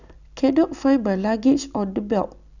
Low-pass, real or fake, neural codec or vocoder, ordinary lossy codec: 7.2 kHz; real; none; none